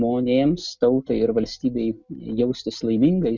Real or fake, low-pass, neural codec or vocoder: real; 7.2 kHz; none